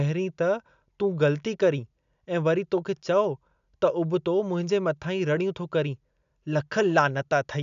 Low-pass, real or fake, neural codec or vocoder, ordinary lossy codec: 7.2 kHz; real; none; none